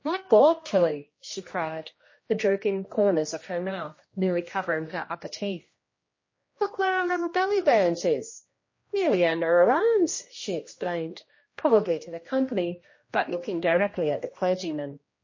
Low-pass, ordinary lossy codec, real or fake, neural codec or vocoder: 7.2 kHz; MP3, 32 kbps; fake; codec, 16 kHz, 1 kbps, X-Codec, HuBERT features, trained on general audio